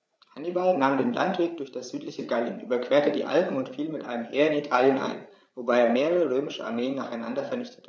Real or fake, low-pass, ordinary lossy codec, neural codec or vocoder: fake; none; none; codec, 16 kHz, 8 kbps, FreqCodec, larger model